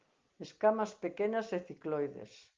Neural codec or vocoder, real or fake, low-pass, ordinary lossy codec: none; real; 7.2 kHz; Opus, 32 kbps